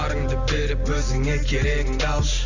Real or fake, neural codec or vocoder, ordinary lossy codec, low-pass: real; none; none; 7.2 kHz